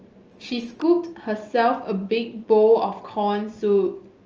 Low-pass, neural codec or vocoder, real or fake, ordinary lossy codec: 7.2 kHz; none; real; Opus, 24 kbps